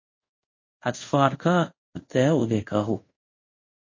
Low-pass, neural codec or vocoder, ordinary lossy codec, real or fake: 7.2 kHz; codec, 24 kHz, 0.5 kbps, DualCodec; MP3, 32 kbps; fake